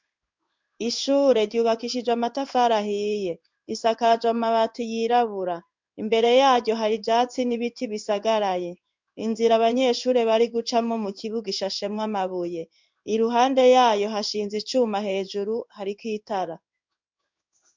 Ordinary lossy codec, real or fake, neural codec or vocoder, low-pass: MP3, 64 kbps; fake; codec, 16 kHz in and 24 kHz out, 1 kbps, XY-Tokenizer; 7.2 kHz